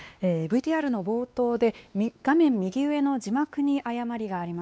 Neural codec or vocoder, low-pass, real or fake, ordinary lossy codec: codec, 16 kHz, 2 kbps, X-Codec, WavLM features, trained on Multilingual LibriSpeech; none; fake; none